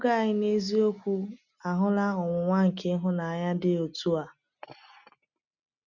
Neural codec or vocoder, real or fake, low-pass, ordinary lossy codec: none; real; 7.2 kHz; none